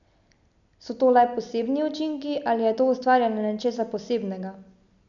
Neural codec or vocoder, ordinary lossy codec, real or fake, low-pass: none; none; real; 7.2 kHz